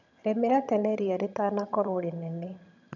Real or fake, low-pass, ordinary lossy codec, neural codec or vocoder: fake; 7.2 kHz; none; vocoder, 22.05 kHz, 80 mel bands, HiFi-GAN